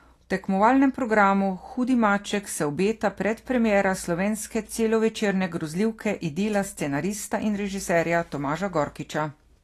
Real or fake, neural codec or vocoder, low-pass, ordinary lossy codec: real; none; 14.4 kHz; AAC, 48 kbps